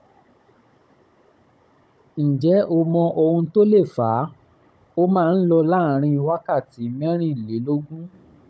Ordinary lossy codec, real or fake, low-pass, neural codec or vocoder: none; fake; none; codec, 16 kHz, 16 kbps, FunCodec, trained on Chinese and English, 50 frames a second